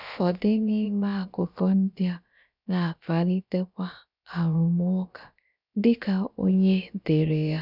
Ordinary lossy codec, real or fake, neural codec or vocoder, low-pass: none; fake; codec, 16 kHz, about 1 kbps, DyCAST, with the encoder's durations; 5.4 kHz